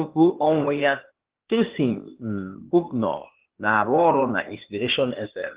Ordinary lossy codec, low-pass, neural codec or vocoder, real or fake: Opus, 16 kbps; 3.6 kHz; codec, 16 kHz, 0.8 kbps, ZipCodec; fake